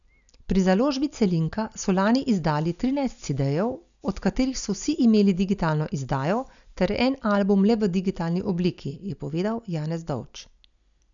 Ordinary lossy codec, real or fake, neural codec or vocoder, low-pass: none; real; none; 7.2 kHz